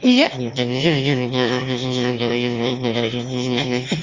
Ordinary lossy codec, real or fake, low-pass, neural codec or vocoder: Opus, 32 kbps; fake; 7.2 kHz; autoencoder, 22.05 kHz, a latent of 192 numbers a frame, VITS, trained on one speaker